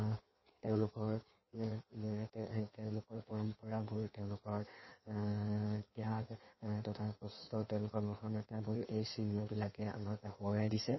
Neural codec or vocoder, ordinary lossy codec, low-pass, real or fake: codec, 16 kHz in and 24 kHz out, 1.1 kbps, FireRedTTS-2 codec; MP3, 24 kbps; 7.2 kHz; fake